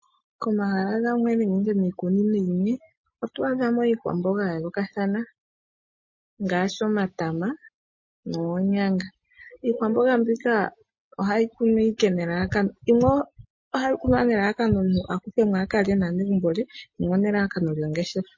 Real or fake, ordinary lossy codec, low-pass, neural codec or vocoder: real; MP3, 32 kbps; 7.2 kHz; none